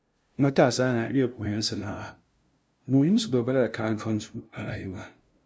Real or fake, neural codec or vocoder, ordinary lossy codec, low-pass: fake; codec, 16 kHz, 0.5 kbps, FunCodec, trained on LibriTTS, 25 frames a second; none; none